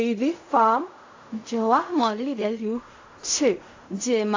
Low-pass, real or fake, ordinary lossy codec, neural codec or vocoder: 7.2 kHz; fake; AAC, 48 kbps; codec, 16 kHz in and 24 kHz out, 0.4 kbps, LongCat-Audio-Codec, fine tuned four codebook decoder